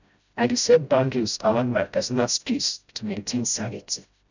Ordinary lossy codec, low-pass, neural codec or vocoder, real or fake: none; 7.2 kHz; codec, 16 kHz, 0.5 kbps, FreqCodec, smaller model; fake